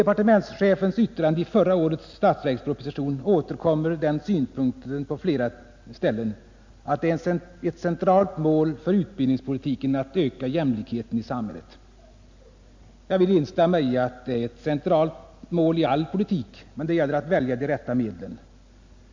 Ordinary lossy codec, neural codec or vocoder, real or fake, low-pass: MP3, 64 kbps; none; real; 7.2 kHz